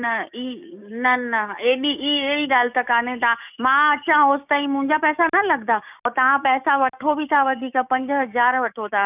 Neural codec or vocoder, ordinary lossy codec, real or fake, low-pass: codec, 16 kHz, 6 kbps, DAC; none; fake; 3.6 kHz